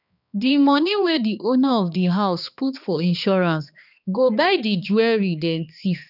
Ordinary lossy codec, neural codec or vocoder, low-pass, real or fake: none; codec, 16 kHz, 2 kbps, X-Codec, HuBERT features, trained on balanced general audio; 5.4 kHz; fake